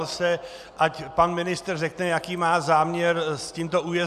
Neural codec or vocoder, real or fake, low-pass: none; real; 14.4 kHz